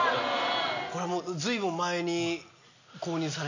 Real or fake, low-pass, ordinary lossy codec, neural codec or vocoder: real; 7.2 kHz; none; none